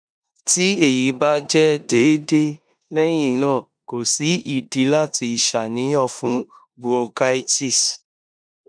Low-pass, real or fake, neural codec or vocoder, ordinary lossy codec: 9.9 kHz; fake; codec, 16 kHz in and 24 kHz out, 0.9 kbps, LongCat-Audio-Codec, four codebook decoder; none